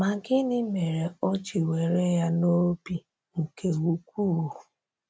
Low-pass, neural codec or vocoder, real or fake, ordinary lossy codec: none; none; real; none